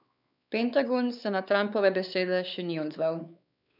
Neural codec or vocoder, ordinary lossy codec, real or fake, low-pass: codec, 16 kHz, 4 kbps, X-Codec, WavLM features, trained on Multilingual LibriSpeech; none; fake; 5.4 kHz